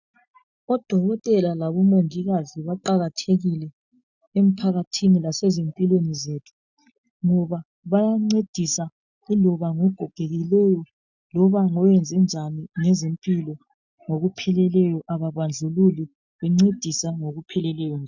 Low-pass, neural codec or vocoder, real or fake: 7.2 kHz; none; real